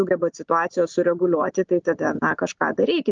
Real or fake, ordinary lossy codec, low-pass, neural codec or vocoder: real; Opus, 32 kbps; 7.2 kHz; none